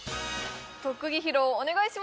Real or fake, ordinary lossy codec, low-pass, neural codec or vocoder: real; none; none; none